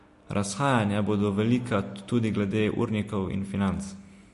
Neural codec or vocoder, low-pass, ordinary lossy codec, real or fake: none; 14.4 kHz; MP3, 48 kbps; real